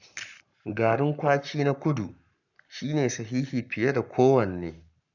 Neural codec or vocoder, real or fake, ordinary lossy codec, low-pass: codec, 44.1 kHz, 7.8 kbps, Pupu-Codec; fake; none; 7.2 kHz